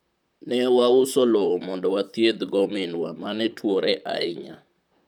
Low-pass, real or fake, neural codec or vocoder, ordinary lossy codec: 19.8 kHz; fake; vocoder, 44.1 kHz, 128 mel bands, Pupu-Vocoder; none